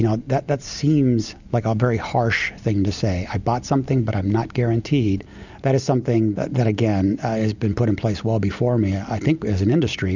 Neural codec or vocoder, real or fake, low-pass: none; real; 7.2 kHz